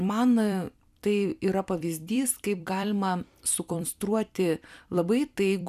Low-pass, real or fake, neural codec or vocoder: 14.4 kHz; fake; vocoder, 44.1 kHz, 128 mel bands every 256 samples, BigVGAN v2